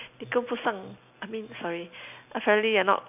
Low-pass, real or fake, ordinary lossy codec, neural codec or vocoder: 3.6 kHz; real; none; none